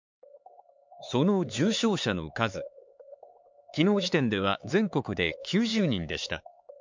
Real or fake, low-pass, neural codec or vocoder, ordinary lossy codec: fake; 7.2 kHz; codec, 16 kHz, 4 kbps, X-Codec, HuBERT features, trained on LibriSpeech; MP3, 64 kbps